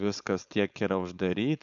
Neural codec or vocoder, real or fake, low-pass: codec, 16 kHz, 4.8 kbps, FACodec; fake; 7.2 kHz